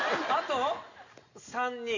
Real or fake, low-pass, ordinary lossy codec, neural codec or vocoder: real; 7.2 kHz; none; none